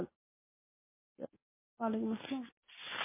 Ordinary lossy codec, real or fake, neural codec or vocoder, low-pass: MP3, 16 kbps; real; none; 3.6 kHz